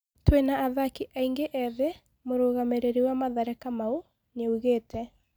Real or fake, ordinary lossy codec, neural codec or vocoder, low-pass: real; none; none; none